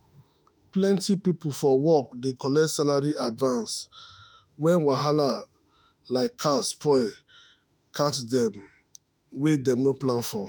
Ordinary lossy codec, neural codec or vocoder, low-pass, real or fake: none; autoencoder, 48 kHz, 32 numbers a frame, DAC-VAE, trained on Japanese speech; none; fake